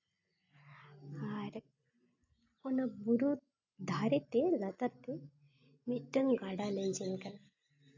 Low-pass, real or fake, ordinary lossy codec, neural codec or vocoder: 7.2 kHz; real; AAC, 48 kbps; none